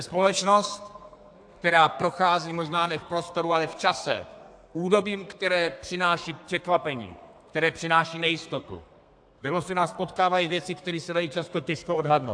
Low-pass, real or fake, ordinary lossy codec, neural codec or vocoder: 9.9 kHz; fake; AAC, 64 kbps; codec, 32 kHz, 1.9 kbps, SNAC